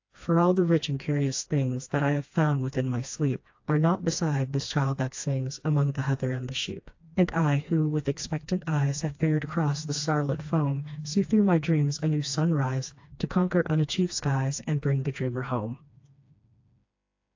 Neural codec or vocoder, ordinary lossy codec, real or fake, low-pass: codec, 16 kHz, 2 kbps, FreqCodec, smaller model; AAC, 48 kbps; fake; 7.2 kHz